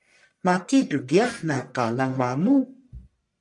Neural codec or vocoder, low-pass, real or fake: codec, 44.1 kHz, 1.7 kbps, Pupu-Codec; 10.8 kHz; fake